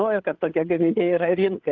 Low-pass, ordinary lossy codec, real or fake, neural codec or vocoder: 7.2 kHz; Opus, 24 kbps; fake; codec, 16 kHz, 8 kbps, FreqCodec, larger model